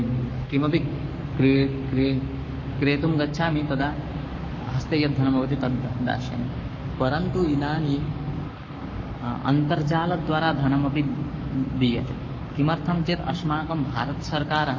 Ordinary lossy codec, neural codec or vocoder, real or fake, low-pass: MP3, 32 kbps; codec, 44.1 kHz, 7.8 kbps, Pupu-Codec; fake; 7.2 kHz